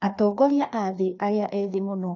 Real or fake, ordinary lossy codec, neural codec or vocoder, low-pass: fake; none; codec, 16 kHz, 2 kbps, FreqCodec, larger model; 7.2 kHz